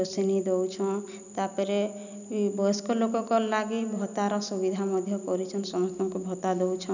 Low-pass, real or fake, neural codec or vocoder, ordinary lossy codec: 7.2 kHz; real; none; none